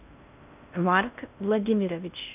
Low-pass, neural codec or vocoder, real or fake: 3.6 kHz; codec, 16 kHz in and 24 kHz out, 0.6 kbps, FocalCodec, streaming, 4096 codes; fake